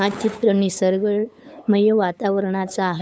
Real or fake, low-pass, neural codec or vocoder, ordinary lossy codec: fake; none; codec, 16 kHz, 8 kbps, FunCodec, trained on LibriTTS, 25 frames a second; none